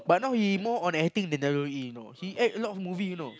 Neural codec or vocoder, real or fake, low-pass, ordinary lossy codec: none; real; none; none